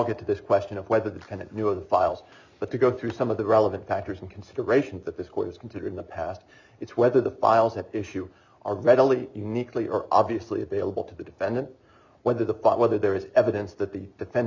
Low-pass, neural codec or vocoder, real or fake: 7.2 kHz; none; real